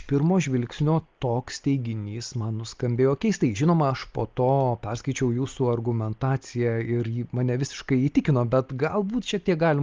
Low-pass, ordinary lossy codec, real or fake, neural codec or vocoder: 7.2 kHz; Opus, 32 kbps; real; none